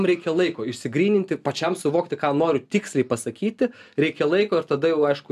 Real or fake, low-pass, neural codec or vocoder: real; 14.4 kHz; none